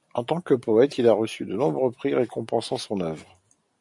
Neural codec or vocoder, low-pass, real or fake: none; 10.8 kHz; real